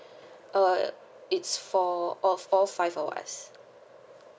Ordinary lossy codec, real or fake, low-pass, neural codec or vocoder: none; real; none; none